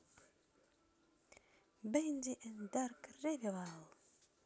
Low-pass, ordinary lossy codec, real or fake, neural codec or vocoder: none; none; real; none